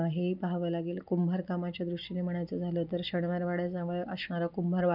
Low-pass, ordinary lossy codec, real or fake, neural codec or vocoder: 5.4 kHz; none; real; none